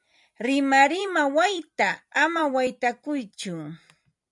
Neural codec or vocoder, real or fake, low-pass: vocoder, 44.1 kHz, 128 mel bands every 256 samples, BigVGAN v2; fake; 10.8 kHz